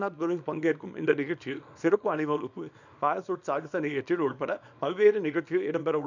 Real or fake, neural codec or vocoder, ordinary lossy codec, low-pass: fake; codec, 24 kHz, 0.9 kbps, WavTokenizer, small release; none; 7.2 kHz